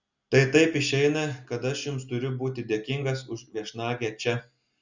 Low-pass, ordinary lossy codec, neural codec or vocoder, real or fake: 7.2 kHz; Opus, 64 kbps; none; real